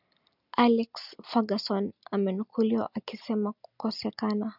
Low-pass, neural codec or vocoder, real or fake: 5.4 kHz; none; real